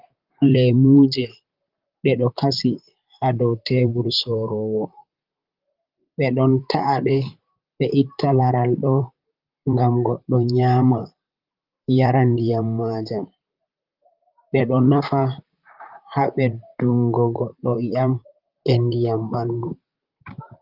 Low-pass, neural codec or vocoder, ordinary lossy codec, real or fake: 5.4 kHz; vocoder, 44.1 kHz, 128 mel bands, Pupu-Vocoder; Opus, 24 kbps; fake